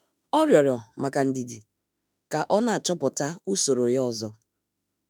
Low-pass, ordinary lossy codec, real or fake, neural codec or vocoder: none; none; fake; autoencoder, 48 kHz, 32 numbers a frame, DAC-VAE, trained on Japanese speech